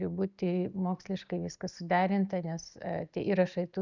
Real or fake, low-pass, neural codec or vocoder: real; 7.2 kHz; none